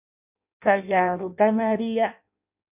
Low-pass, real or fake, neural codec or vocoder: 3.6 kHz; fake; codec, 16 kHz in and 24 kHz out, 0.6 kbps, FireRedTTS-2 codec